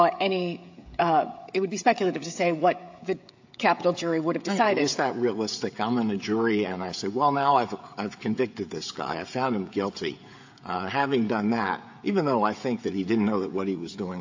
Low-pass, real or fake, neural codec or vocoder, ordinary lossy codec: 7.2 kHz; fake; codec, 16 kHz, 16 kbps, FreqCodec, smaller model; AAC, 48 kbps